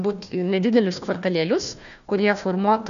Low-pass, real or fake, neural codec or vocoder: 7.2 kHz; fake; codec, 16 kHz, 1 kbps, FunCodec, trained on Chinese and English, 50 frames a second